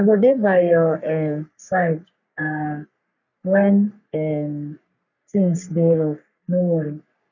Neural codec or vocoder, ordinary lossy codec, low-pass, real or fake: codec, 44.1 kHz, 3.4 kbps, Pupu-Codec; none; 7.2 kHz; fake